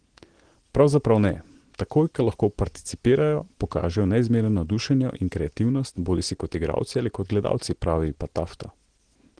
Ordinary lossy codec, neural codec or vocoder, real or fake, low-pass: Opus, 16 kbps; none; real; 9.9 kHz